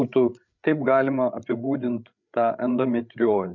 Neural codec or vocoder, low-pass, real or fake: codec, 16 kHz, 8 kbps, FreqCodec, larger model; 7.2 kHz; fake